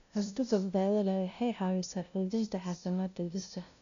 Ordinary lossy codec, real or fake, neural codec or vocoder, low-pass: none; fake; codec, 16 kHz, 0.5 kbps, FunCodec, trained on LibriTTS, 25 frames a second; 7.2 kHz